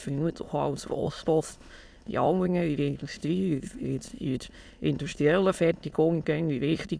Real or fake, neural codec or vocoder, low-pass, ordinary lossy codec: fake; autoencoder, 22.05 kHz, a latent of 192 numbers a frame, VITS, trained on many speakers; none; none